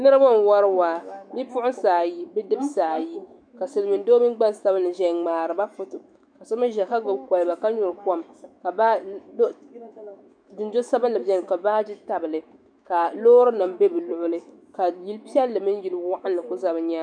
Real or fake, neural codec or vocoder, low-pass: fake; autoencoder, 48 kHz, 128 numbers a frame, DAC-VAE, trained on Japanese speech; 9.9 kHz